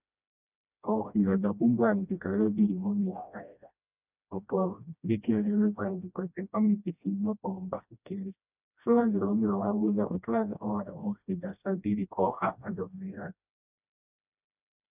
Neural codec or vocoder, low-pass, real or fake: codec, 16 kHz, 1 kbps, FreqCodec, smaller model; 3.6 kHz; fake